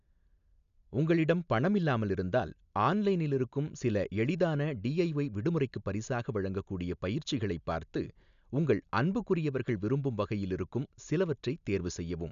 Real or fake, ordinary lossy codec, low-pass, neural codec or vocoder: real; none; 7.2 kHz; none